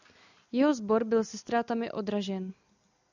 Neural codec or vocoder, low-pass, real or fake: none; 7.2 kHz; real